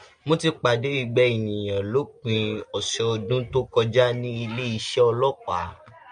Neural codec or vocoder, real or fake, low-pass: none; real; 9.9 kHz